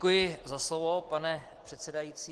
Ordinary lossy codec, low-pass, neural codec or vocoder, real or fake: Opus, 16 kbps; 10.8 kHz; none; real